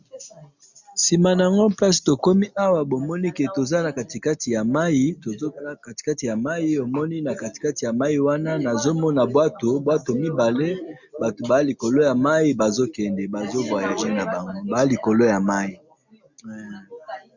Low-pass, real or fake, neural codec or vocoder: 7.2 kHz; real; none